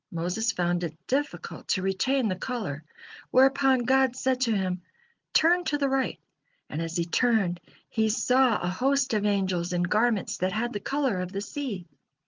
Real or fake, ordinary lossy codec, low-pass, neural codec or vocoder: real; Opus, 32 kbps; 7.2 kHz; none